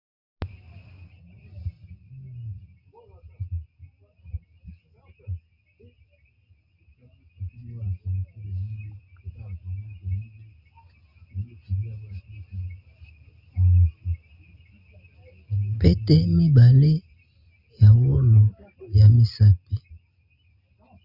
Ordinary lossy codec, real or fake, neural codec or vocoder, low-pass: Opus, 64 kbps; real; none; 5.4 kHz